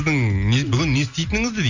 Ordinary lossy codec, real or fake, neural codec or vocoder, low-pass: Opus, 64 kbps; real; none; 7.2 kHz